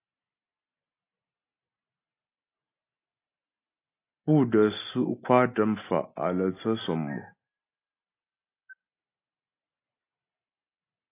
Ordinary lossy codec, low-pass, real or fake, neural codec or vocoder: AAC, 24 kbps; 3.6 kHz; real; none